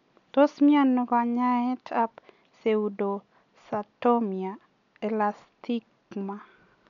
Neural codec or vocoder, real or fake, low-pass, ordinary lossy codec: none; real; 7.2 kHz; none